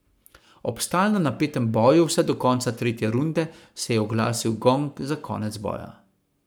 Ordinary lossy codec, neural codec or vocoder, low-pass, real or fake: none; codec, 44.1 kHz, 7.8 kbps, Pupu-Codec; none; fake